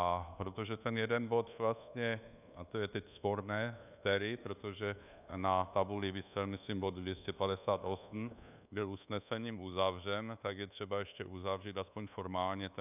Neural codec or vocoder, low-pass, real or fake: codec, 24 kHz, 1.2 kbps, DualCodec; 3.6 kHz; fake